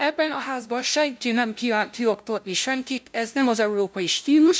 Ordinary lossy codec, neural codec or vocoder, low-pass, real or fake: none; codec, 16 kHz, 0.5 kbps, FunCodec, trained on LibriTTS, 25 frames a second; none; fake